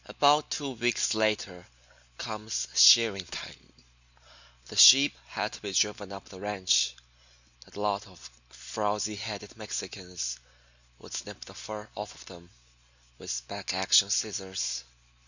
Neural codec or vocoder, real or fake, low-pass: none; real; 7.2 kHz